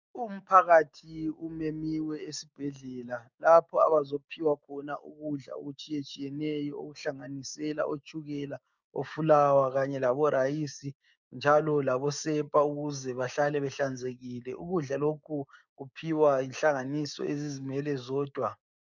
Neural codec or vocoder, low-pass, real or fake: codec, 16 kHz, 6 kbps, DAC; 7.2 kHz; fake